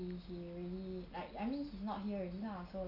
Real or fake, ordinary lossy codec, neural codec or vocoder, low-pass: real; none; none; 5.4 kHz